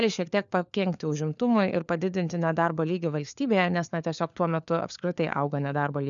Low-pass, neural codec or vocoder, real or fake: 7.2 kHz; codec, 16 kHz, 4 kbps, FunCodec, trained on LibriTTS, 50 frames a second; fake